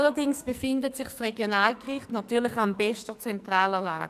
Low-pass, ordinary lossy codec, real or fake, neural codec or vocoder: 14.4 kHz; none; fake; codec, 44.1 kHz, 2.6 kbps, SNAC